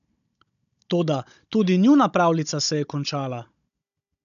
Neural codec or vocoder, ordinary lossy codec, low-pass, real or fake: codec, 16 kHz, 16 kbps, FunCodec, trained on Chinese and English, 50 frames a second; none; 7.2 kHz; fake